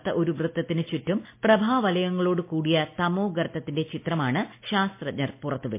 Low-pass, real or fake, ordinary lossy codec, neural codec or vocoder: 3.6 kHz; real; MP3, 24 kbps; none